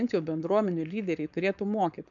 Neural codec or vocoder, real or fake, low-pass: codec, 16 kHz, 4.8 kbps, FACodec; fake; 7.2 kHz